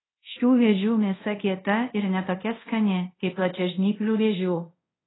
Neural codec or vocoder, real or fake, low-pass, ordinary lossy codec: codec, 24 kHz, 0.5 kbps, DualCodec; fake; 7.2 kHz; AAC, 16 kbps